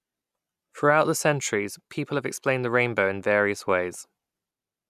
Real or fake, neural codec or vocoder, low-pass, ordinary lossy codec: real; none; 14.4 kHz; none